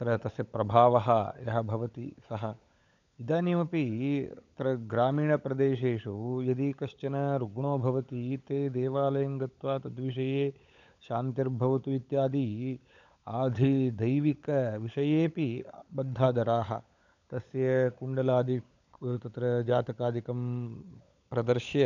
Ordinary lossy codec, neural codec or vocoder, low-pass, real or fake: none; codec, 16 kHz, 16 kbps, FunCodec, trained on LibriTTS, 50 frames a second; 7.2 kHz; fake